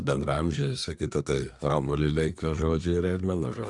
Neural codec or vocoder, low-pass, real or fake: codec, 24 kHz, 1 kbps, SNAC; 10.8 kHz; fake